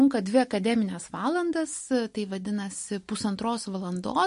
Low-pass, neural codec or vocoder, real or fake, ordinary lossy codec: 10.8 kHz; none; real; MP3, 48 kbps